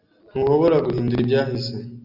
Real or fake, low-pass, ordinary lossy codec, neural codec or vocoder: real; 5.4 kHz; Opus, 64 kbps; none